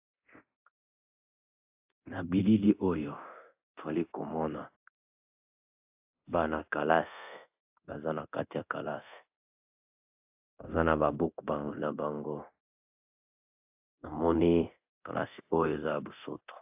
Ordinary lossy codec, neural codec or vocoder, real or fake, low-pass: AAC, 32 kbps; codec, 24 kHz, 0.9 kbps, DualCodec; fake; 3.6 kHz